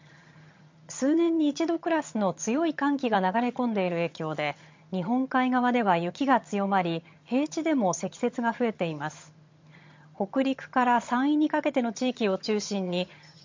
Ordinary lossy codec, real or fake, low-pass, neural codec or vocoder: MP3, 48 kbps; fake; 7.2 kHz; vocoder, 22.05 kHz, 80 mel bands, HiFi-GAN